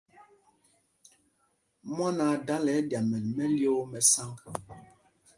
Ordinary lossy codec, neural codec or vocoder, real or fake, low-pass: Opus, 32 kbps; none; real; 10.8 kHz